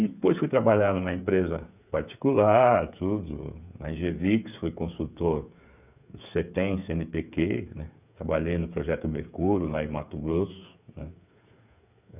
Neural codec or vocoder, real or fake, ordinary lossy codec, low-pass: codec, 16 kHz, 8 kbps, FreqCodec, smaller model; fake; none; 3.6 kHz